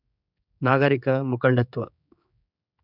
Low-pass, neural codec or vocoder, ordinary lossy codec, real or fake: 5.4 kHz; codec, 16 kHz, 4 kbps, X-Codec, HuBERT features, trained on general audio; none; fake